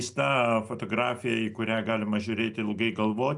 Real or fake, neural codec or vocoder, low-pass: real; none; 10.8 kHz